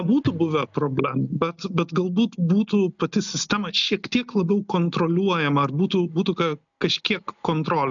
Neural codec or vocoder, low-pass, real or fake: none; 7.2 kHz; real